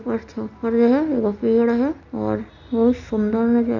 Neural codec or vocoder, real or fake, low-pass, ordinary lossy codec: none; real; 7.2 kHz; MP3, 64 kbps